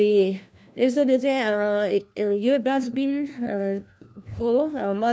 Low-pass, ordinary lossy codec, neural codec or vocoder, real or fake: none; none; codec, 16 kHz, 1 kbps, FunCodec, trained on LibriTTS, 50 frames a second; fake